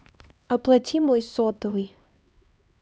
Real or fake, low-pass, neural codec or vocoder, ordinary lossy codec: fake; none; codec, 16 kHz, 2 kbps, X-Codec, HuBERT features, trained on LibriSpeech; none